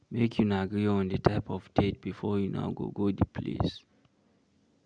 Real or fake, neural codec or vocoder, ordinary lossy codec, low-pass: real; none; none; 9.9 kHz